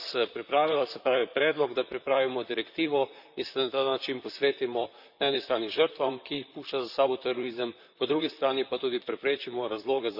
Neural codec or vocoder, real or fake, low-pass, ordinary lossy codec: vocoder, 44.1 kHz, 128 mel bands, Pupu-Vocoder; fake; 5.4 kHz; none